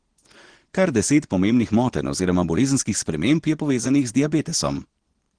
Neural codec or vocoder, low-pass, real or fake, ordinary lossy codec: vocoder, 24 kHz, 100 mel bands, Vocos; 9.9 kHz; fake; Opus, 16 kbps